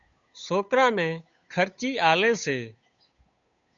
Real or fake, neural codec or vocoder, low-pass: fake; codec, 16 kHz, 8 kbps, FunCodec, trained on Chinese and English, 25 frames a second; 7.2 kHz